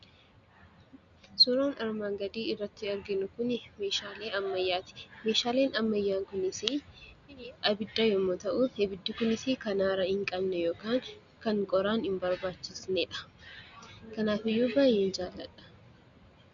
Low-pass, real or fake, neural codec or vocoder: 7.2 kHz; real; none